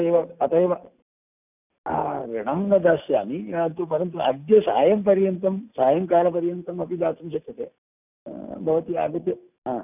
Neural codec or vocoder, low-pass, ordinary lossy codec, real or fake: none; 3.6 kHz; none; real